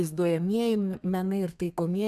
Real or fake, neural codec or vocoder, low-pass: fake; codec, 32 kHz, 1.9 kbps, SNAC; 14.4 kHz